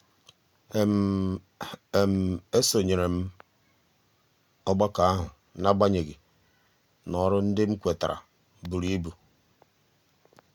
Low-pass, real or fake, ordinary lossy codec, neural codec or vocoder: 19.8 kHz; real; none; none